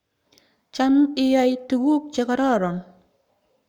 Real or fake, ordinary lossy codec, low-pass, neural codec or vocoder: fake; none; 19.8 kHz; codec, 44.1 kHz, 7.8 kbps, Pupu-Codec